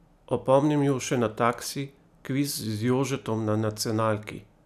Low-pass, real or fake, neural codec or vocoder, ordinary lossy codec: 14.4 kHz; real; none; none